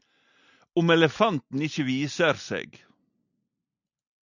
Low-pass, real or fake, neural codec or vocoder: 7.2 kHz; real; none